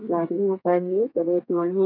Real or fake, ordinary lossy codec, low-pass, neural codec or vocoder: fake; none; 5.4 kHz; codec, 32 kHz, 1.9 kbps, SNAC